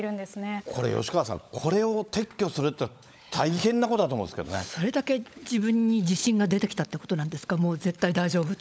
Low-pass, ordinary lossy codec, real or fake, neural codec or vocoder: none; none; fake; codec, 16 kHz, 16 kbps, FunCodec, trained on LibriTTS, 50 frames a second